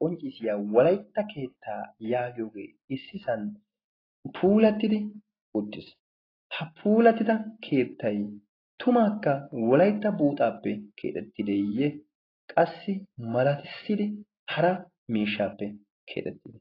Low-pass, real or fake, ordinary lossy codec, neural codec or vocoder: 5.4 kHz; real; AAC, 24 kbps; none